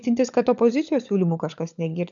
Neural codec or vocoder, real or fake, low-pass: codec, 16 kHz, 16 kbps, FunCodec, trained on LibriTTS, 50 frames a second; fake; 7.2 kHz